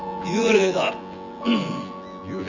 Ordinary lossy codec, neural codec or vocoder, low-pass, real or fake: Opus, 64 kbps; vocoder, 24 kHz, 100 mel bands, Vocos; 7.2 kHz; fake